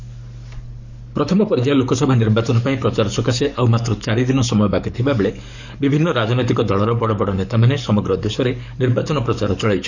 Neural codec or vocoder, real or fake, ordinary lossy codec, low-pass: codec, 44.1 kHz, 7.8 kbps, DAC; fake; none; 7.2 kHz